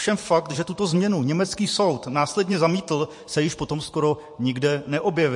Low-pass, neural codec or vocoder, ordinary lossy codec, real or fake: 10.8 kHz; vocoder, 44.1 kHz, 128 mel bands every 512 samples, BigVGAN v2; MP3, 48 kbps; fake